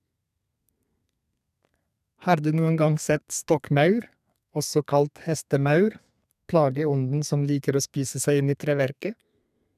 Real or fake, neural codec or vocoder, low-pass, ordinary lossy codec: fake; codec, 32 kHz, 1.9 kbps, SNAC; 14.4 kHz; none